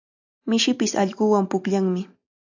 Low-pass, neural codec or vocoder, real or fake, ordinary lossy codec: 7.2 kHz; none; real; AAC, 48 kbps